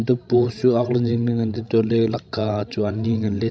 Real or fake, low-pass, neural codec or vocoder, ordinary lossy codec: fake; none; codec, 16 kHz, 16 kbps, FreqCodec, larger model; none